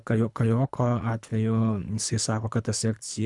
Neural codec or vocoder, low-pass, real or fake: codec, 24 kHz, 3 kbps, HILCodec; 10.8 kHz; fake